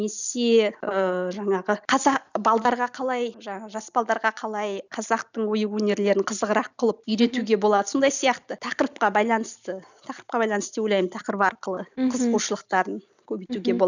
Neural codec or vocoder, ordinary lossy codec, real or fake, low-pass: none; none; real; 7.2 kHz